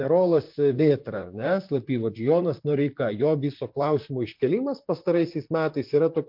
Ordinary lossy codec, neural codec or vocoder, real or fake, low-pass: MP3, 48 kbps; vocoder, 44.1 kHz, 128 mel bands, Pupu-Vocoder; fake; 5.4 kHz